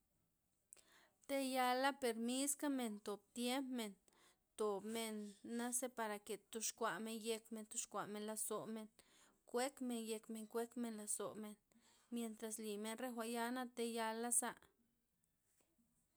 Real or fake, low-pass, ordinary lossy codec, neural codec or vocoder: real; none; none; none